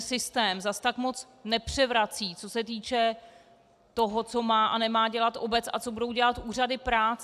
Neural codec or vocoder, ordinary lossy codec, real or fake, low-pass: none; AAC, 96 kbps; real; 14.4 kHz